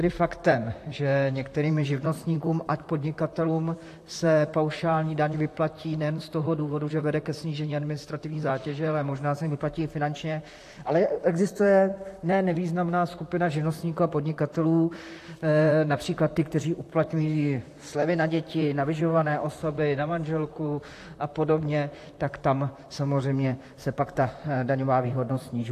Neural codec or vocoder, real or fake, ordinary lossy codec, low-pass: vocoder, 44.1 kHz, 128 mel bands, Pupu-Vocoder; fake; AAC, 64 kbps; 14.4 kHz